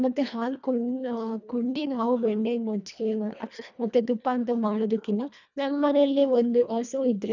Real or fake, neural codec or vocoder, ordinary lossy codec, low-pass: fake; codec, 24 kHz, 1.5 kbps, HILCodec; none; 7.2 kHz